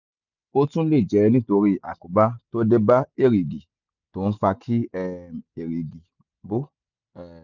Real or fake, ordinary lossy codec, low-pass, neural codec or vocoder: real; none; 7.2 kHz; none